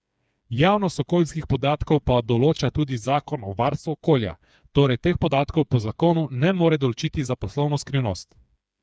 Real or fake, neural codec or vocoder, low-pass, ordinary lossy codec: fake; codec, 16 kHz, 4 kbps, FreqCodec, smaller model; none; none